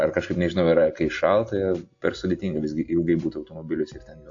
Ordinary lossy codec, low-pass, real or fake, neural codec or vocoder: AAC, 48 kbps; 7.2 kHz; real; none